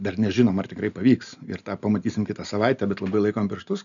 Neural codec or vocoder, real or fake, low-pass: none; real; 7.2 kHz